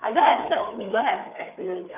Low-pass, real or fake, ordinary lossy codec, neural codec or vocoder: 3.6 kHz; fake; none; codec, 16 kHz, 4 kbps, FreqCodec, larger model